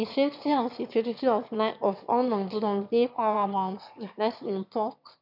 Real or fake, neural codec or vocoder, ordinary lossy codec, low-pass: fake; autoencoder, 22.05 kHz, a latent of 192 numbers a frame, VITS, trained on one speaker; none; 5.4 kHz